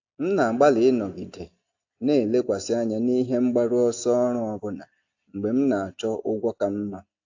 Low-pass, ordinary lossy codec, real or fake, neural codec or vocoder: 7.2 kHz; AAC, 48 kbps; real; none